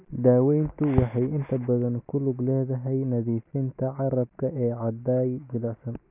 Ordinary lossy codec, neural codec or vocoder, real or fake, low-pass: AAC, 32 kbps; none; real; 3.6 kHz